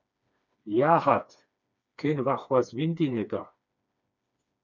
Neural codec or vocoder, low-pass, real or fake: codec, 16 kHz, 2 kbps, FreqCodec, smaller model; 7.2 kHz; fake